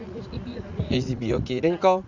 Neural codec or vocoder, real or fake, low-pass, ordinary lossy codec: vocoder, 44.1 kHz, 80 mel bands, Vocos; fake; 7.2 kHz; none